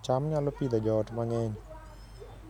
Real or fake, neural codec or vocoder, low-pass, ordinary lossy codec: real; none; 19.8 kHz; none